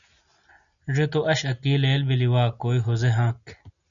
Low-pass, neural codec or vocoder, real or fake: 7.2 kHz; none; real